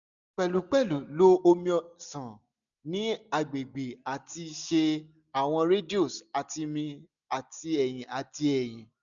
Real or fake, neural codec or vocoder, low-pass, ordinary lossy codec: real; none; 7.2 kHz; none